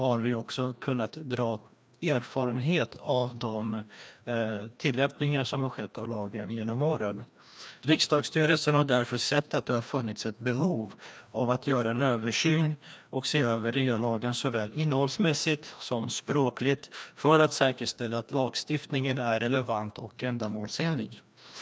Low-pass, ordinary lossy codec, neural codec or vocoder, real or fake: none; none; codec, 16 kHz, 1 kbps, FreqCodec, larger model; fake